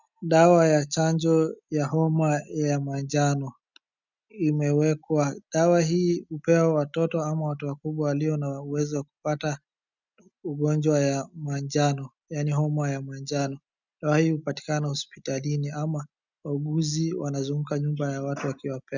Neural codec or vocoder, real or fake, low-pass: none; real; 7.2 kHz